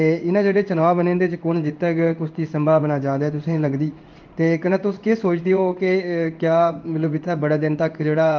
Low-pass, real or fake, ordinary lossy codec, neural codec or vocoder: 7.2 kHz; fake; Opus, 32 kbps; codec, 16 kHz in and 24 kHz out, 1 kbps, XY-Tokenizer